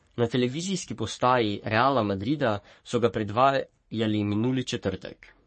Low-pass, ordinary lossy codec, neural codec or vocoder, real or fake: 10.8 kHz; MP3, 32 kbps; codec, 44.1 kHz, 3.4 kbps, Pupu-Codec; fake